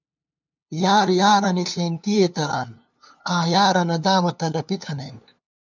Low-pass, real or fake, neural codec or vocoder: 7.2 kHz; fake; codec, 16 kHz, 2 kbps, FunCodec, trained on LibriTTS, 25 frames a second